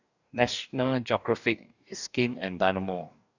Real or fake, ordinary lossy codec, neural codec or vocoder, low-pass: fake; none; codec, 44.1 kHz, 2.6 kbps, DAC; 7.2 kHz